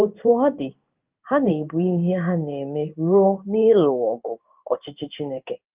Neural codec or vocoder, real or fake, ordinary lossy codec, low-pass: codec, 16 kHz in and 24 kHz out, 1 kbps, XY-Tokenizer; fake; Opus, 24 kbps; 3.6 kHz